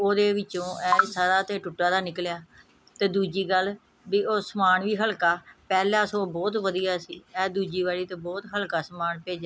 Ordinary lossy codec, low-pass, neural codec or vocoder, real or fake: none; none; none; real